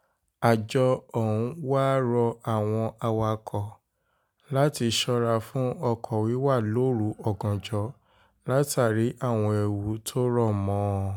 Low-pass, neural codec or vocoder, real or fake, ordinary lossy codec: none; none; real; none